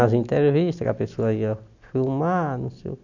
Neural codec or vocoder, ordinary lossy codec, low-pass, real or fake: none; none; 7.2 kHz; real